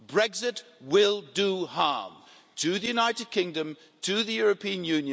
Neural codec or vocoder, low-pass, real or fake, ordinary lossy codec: none; none; real; none